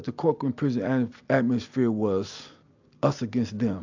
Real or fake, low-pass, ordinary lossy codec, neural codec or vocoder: real; 7.2 kHz; AAC, 48 kbps; none